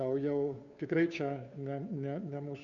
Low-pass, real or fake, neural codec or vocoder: 7.2 kHz; fake; codec, 16 kHz, 2 kbps, FunCodec, trained on Chinese and English, 25 frames a second